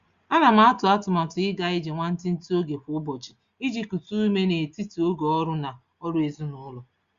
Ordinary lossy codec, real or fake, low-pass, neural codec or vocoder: Opus, 64 kbps; real; 7.2 kHz; none